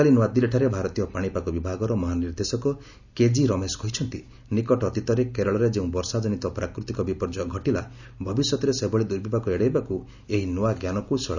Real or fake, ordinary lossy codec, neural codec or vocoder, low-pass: real; none; none; 7.2 kHz